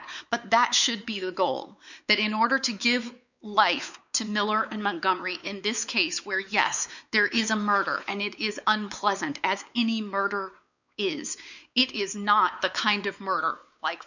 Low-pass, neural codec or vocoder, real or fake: 7.2 kHz; codec, 16 kHz, 4 kbps, X-Codec, WavLM features, trained on Multilingual LibriSpeech; fake